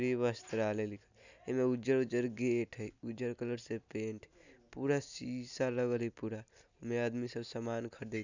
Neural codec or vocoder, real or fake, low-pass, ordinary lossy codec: none; real; 7.2 kHz; none